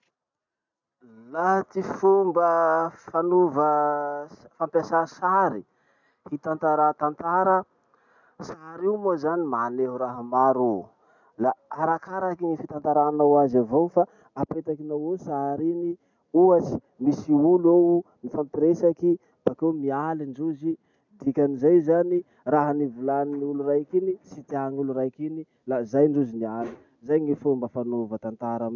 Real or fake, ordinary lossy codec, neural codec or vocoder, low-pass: real; none; none; 7.2 kHz